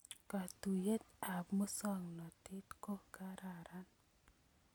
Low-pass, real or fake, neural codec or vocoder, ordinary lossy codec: none; real; none; none